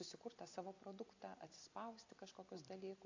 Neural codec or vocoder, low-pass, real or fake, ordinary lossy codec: none; 7.2 kHz; real; AAC, 48 kbps